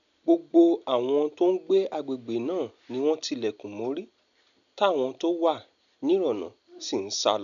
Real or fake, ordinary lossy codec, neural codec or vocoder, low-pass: real; none; none; 7.2 kHz